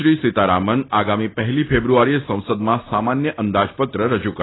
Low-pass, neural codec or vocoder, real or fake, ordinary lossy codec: 7.2 kHz; none; real; AAC, 16 kbps